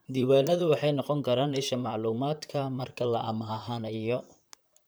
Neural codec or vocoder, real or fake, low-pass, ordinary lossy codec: vocoder, 44.1 kHz, 128 mel bands, Pupu-Vocoder; fake; none; none